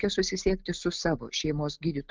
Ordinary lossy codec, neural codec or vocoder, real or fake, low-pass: Opus, 32 kbps; none; real; 7.2 kHz